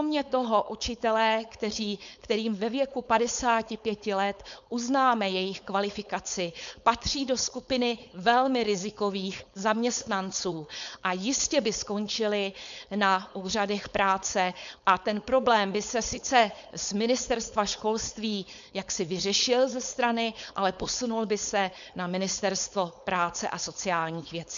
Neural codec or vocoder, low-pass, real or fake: codec, 16 kHz, 4.8 kbps, FACodec; 7.2 kHz; fake